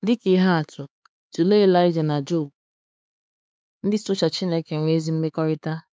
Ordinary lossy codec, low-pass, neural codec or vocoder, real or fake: none; none; codec, 16 kHz, 4 kbps, X-Codec, HuBERT features, trained on LibriSpeech; fake